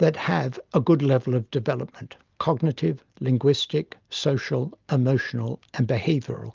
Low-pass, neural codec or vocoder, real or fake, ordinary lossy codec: 7.2 kHz; none; real; Opus, 32 kbps